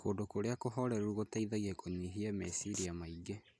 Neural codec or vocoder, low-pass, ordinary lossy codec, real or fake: none; none; none; real